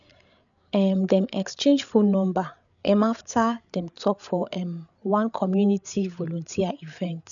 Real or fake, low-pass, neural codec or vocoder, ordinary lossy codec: fake; 7.2 kHz; codec, 16 kHz, 8 kbps, FreqCodec, larger model; none